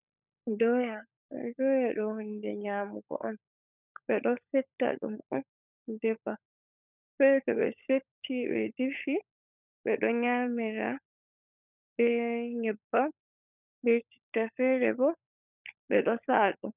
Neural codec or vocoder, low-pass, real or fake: codec, 16 kHz, 16 kbps, FunCodec, trained on LibriTTS, 50 frames a second; 3.6 kHz; fake